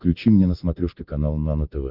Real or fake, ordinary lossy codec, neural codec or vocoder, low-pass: real; Opus, 32 kbps; none; 5.4 kHz